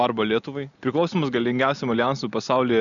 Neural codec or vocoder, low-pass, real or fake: none; 7.2 kHz; real